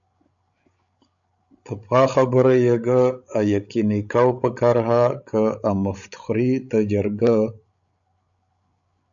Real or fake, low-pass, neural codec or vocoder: fake; 7.2 kHz; codec, 16 kHz, 16 kbps, FreqCodec, larger model